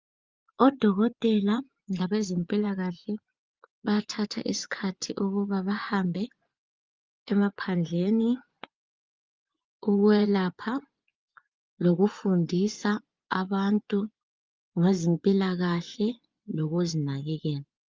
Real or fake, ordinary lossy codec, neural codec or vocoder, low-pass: fake; Opus, 32 kbps; vocoder, 24 kHz, 100 mel bands, Vocos; 7.2 kHz